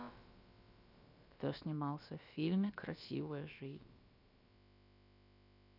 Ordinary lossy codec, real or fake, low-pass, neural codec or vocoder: none; fake; 5.4 kHz; codec, 16 kHz, about 1 kbps, DyCAST, with the encoder's durations